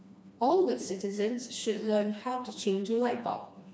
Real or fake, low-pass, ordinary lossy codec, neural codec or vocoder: fake; none; none; codec, 16 kHz, 2 kbps, FreqCodec, smaller model